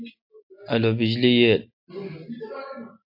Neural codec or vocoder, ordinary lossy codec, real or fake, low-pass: none; AAC, 32 kbps; real; 5.4 kHz